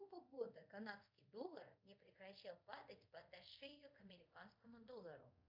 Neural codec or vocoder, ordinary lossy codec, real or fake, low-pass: codec, 24 kHz, 0.5 kbps, DualCodec; MP3, 48 kbps; fake; 5.4 kHz